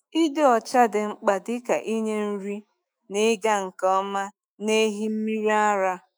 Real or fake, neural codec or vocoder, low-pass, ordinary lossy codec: fake; autoencoder, 48 kHz, 128 numbers a frame, DAC-VAE, trained on Japanese speech; none; none